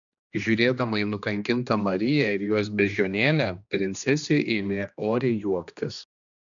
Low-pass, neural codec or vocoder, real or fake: 7.2 kHz; codec, 16 kHz, 2 kbps, X-Codec, HuBERT features, trained on general audio; fake